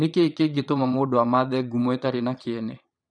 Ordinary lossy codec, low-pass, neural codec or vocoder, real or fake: none; 9.9 kHz; vocoder, 22.05 kHz, 80 mel bands, Vocos; fake